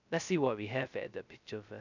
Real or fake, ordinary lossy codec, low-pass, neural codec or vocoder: fake; none; 7.2 kHz; codec, 16 kHz, 0.2 kbps, FocalCodec